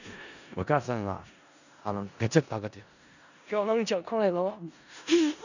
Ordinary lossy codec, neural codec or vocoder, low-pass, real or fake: none; codec, 16 kHz in and 24 kHz out, 0.4 kbps, LongCat-Audio-Codec, four codebook decoder; 7.2 kHz; fake